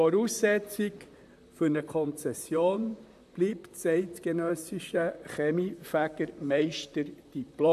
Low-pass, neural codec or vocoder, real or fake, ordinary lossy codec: 14.4 kHz; vocoder, 44.1 kHz, 128 mel bands, Pupu-Vocoder; fake; AAC, 96 kbps